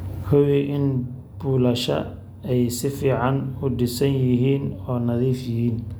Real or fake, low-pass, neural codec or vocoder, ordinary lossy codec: real; none; none; none